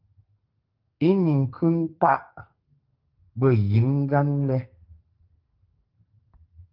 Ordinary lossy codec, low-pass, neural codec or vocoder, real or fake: Opus, 32 kbps; 5.4 kHz; codec, 44.1 kHz, 2.6 kbps, SNAC; fake